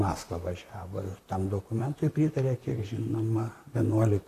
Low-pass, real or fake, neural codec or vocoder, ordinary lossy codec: 14.4 kHz; fake; vocoder, 44.1 kHz, 128 mel bands, Pupu-Vocoder; AAC, 64 kbps